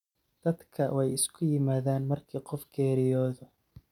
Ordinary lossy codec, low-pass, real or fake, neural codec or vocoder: none; 19.8 kHz; real; none